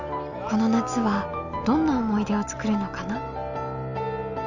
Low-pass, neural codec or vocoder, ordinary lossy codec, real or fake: 7.2 kHz; none; none; real